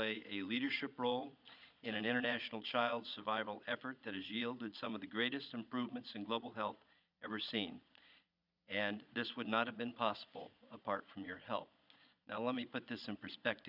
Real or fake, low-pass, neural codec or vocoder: fake; 5.4 kHz; vocoder, 22.05 kHz, 80 mel bands, Vocos